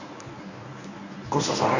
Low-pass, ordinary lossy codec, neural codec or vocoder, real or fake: 7.2 kHz; none; codec, 24 kHz, 0.9 kbps, WavTokenizer, medium speech release version 1; fake